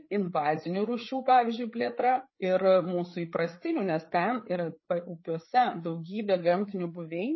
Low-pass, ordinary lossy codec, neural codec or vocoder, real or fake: 7.2 kHz; MP3, 24 kbps; codec, 16 kHz, 4 kbps, FreqCodec, larger model; fake